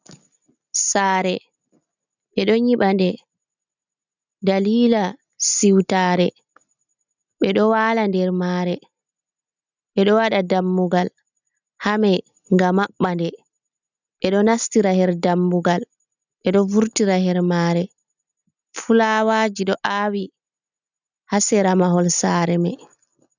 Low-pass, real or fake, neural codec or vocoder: 7.2 kHz; real; none